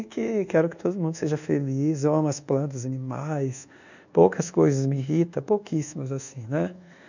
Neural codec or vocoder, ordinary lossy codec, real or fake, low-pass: codec, 24 kHz, 1.2 kbps, DualCodec; none; fake; 7.2 kHz